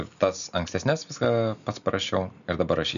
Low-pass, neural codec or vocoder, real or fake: 7.2 kHz; none; real